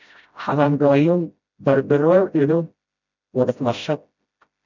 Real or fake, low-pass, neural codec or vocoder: fake; 7.2 kHz; codec, 16 kHz, 0.5 kbps, FreqCodec, smaller model